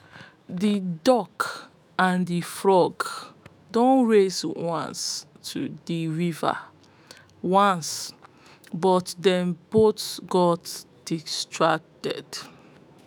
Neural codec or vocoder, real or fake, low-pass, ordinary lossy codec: autoencoder, 48 kHz, 128 numbers a frame, DAC-VAE, trained on Japanese speech; fake; none; none